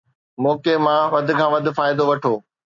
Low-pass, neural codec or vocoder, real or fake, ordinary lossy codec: 7.2 kHz; none; real; AAC, 64 kbps